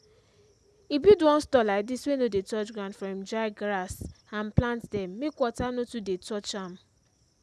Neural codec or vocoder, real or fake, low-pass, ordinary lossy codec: none; real; none; none